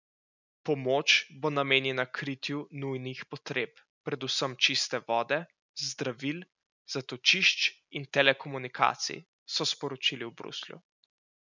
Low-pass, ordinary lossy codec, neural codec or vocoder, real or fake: 7.2 kHz; none; none; real